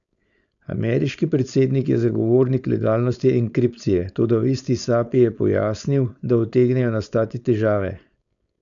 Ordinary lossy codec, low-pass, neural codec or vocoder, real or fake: none; 7.2 kHz; codec, 16 kHz, 4.8 kbps, FACodec; fake